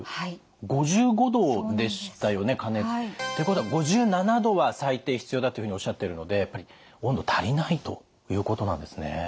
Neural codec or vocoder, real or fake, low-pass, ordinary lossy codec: none; real; none; none